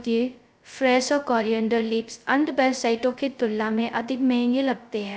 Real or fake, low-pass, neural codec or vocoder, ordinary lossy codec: fake; none; codec, 16 kHz, 0.2 kbps, FocalCodec; none